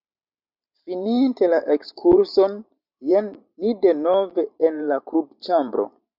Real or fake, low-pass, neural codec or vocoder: fake; 5.4 kHz; vocoder, 44.1 kHz, 128 mel bands every 512 samples, BigVGAN v2